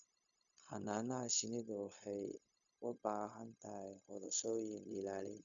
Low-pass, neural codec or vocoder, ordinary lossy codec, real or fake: 7.2 kHz; codec, 16 kHz, 0.4 kbps, LongCat-Audio-Codec; MP3, 64 kbps; fake